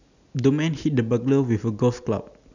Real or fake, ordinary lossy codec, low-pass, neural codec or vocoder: real; none; 7.2 kHz; none